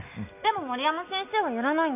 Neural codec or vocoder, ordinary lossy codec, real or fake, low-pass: none; none; real; 3.6 kHz